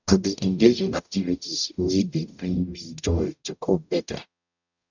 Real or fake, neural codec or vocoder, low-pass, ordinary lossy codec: fake; codec, 44.1 kHz, 0.9 kbps, DAC; 7.2 kHz; none